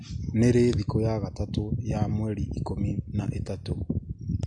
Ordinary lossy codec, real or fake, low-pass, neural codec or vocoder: MP3, 48 kbps; real; 9.9 kHz; none